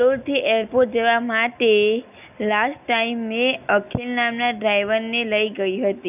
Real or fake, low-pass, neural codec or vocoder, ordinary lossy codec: real; 3.6 kHz; none; none